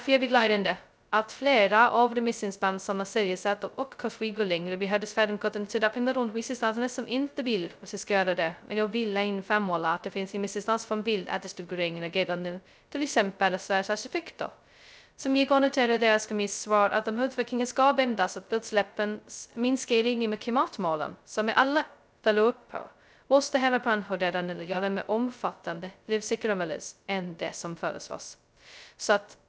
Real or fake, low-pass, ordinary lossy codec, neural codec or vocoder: fake; none; none; codec, 16 kHz, 0.2 kbps, FocalCodec